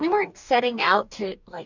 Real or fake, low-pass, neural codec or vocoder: fake; 7.2 kHz; codec, 44.1 kHz, 2.6 kbps, DAC